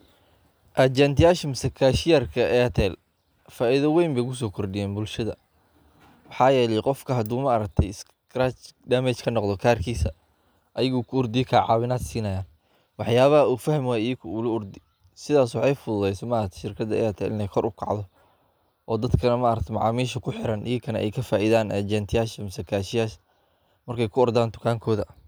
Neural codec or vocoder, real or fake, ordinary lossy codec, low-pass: none; real; none; none